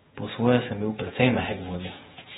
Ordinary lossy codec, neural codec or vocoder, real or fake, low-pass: AAC, 16 kbps; vocoder, 48 kHz, 128 mel bands, Vocos; fake; 19.8 kHz